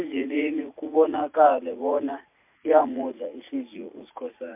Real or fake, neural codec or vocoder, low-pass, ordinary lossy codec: fake; vocoder, 44.1 kHz, 80 mel bands, Vocos; 3.6 kHz; MP3, 32 kbps